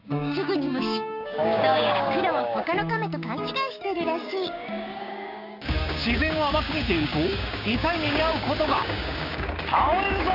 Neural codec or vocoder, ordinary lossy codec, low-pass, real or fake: codec, 16 kHz, 6 kbps, DAC; none; 5.4 kHz; fake